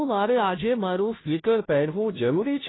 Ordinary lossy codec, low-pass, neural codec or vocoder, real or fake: AAC, 16 kbps; 7.2 kHz; codec, 16 kHz, 0.5 kbps, FunCodec, trained on Chinese and English, 25 frames a second; fake